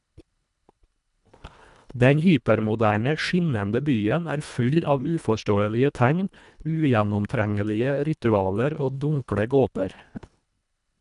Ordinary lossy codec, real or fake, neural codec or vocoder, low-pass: none; fake; codec, 24 kHz, 1.5 kbps, HILCodec; 10.8 kHz